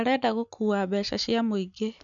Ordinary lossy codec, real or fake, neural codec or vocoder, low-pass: none; real; none; 7.2 kHz